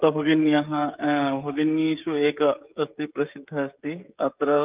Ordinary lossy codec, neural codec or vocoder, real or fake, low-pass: Opus, 24 kbps; codec, 16 kHz, 16 kbps, FreqCodec, smaller model; fake; 3.6 kHz